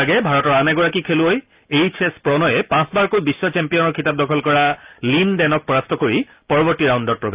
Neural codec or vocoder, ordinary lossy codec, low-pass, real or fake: none; Opus, 24 kbps; 3.6 kHz; real